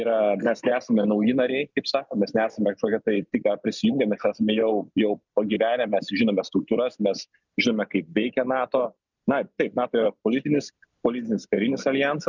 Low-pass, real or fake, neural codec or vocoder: 7.2 kHz; real; none